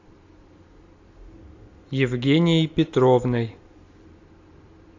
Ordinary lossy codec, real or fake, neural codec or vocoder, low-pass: AAC, 48 kbps; real; none; 7.2 kHz